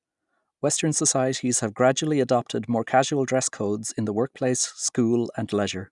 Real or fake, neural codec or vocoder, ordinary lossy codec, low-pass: real; none; none; 10.8 kHz